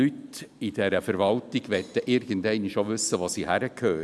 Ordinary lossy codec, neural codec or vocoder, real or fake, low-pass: none; none; real; none